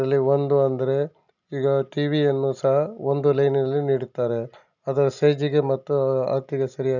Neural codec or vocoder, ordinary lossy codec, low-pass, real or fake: none; none; 7.2 kHz; real